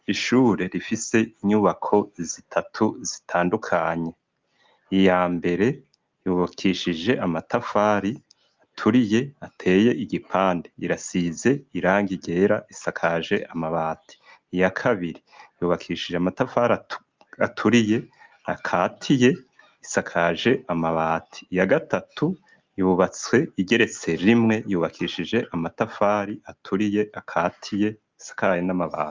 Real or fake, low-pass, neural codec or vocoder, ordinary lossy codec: real; 7.2 kHz; none; Opus, 24 kbps